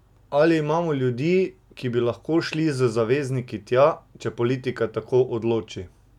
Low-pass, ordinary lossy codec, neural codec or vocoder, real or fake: 19.8 kHz; none; none; real